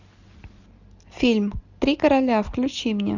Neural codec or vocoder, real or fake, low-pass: none; real; 7.2 kHz